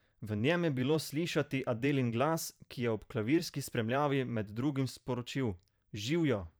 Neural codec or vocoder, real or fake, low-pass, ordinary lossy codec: vocoder, 44.1 kHz, 128 mel bands every 512 samples, BigVGAN v2; fake; none; none